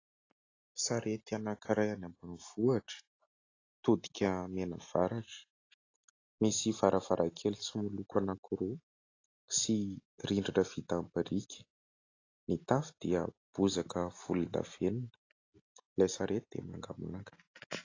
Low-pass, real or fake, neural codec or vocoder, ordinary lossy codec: 7.2 kHz; real; none; AAC, 32 kbps